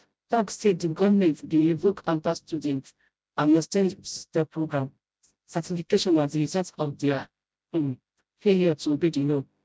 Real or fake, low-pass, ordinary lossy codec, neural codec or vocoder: fake; none; none; codec, 16 kHz, 0.5 kbps, FreqCodec, smaller model